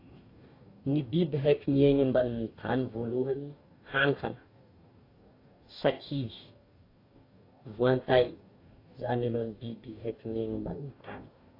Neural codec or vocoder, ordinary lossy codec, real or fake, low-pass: codec, 44.1 kHz, 2.6 kbps, DAC; AAC, 32 kbps; fake; 5.4 kHz